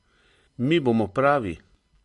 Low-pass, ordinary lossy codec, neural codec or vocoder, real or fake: 14.4 kHz; MP3, 48 kbps; none; real